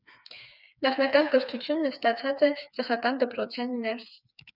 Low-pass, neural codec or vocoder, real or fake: 5.4 kHz; codec, 16 kHz, 4 kbps, FreqCodec, smaller model; fake